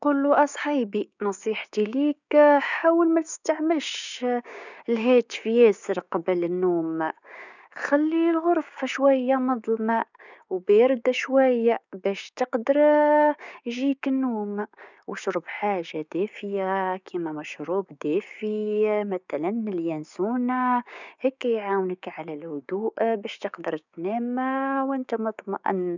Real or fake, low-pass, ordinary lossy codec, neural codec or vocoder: fake; 7.2 kHz; none; codec, 16 kHz, 6 kbps, DAC